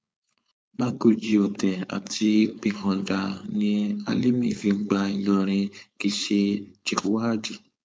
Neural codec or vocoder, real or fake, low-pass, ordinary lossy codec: codec, 16 kHz, 4.8 kbps, FACodec; fake; none; none